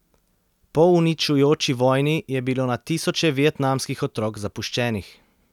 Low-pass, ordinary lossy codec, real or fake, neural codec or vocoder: 19.8 kHz; none; real; none